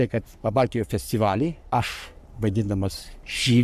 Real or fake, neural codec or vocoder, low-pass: fake; codec, 44.1 kHz, 3.4 kbps, Pupu-Codec; 14.4 kHz